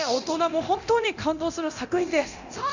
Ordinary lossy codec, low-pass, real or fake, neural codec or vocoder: none; 7.2 kHz; fake; codec, 24 kHz, 0.9 kbps, DualCodec